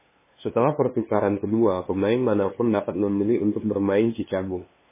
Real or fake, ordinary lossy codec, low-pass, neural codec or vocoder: fake; MP3, 16 kbps; 3.6 kHz; codec, 16 kHz, 2 kbps, FunCodec, trained on LibriTTS, 25 frames a second